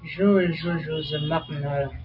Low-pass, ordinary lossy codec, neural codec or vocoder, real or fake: 5.4 kHz; AAC, 48 kbps; none; real